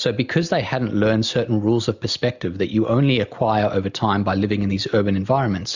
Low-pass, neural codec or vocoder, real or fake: 7.2 kHz; none; real